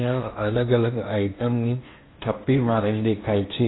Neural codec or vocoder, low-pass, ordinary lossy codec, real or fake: codec, 16 kHz in and 24 kHz out, 0.8 kbps, FocalCodec, streaming, 65536 codes; 7.2 kHz; AAC, 16 kbps; fake